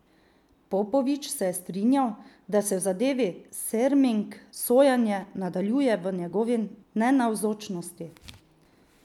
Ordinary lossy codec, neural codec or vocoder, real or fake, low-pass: none; none; real; 19.8 kHz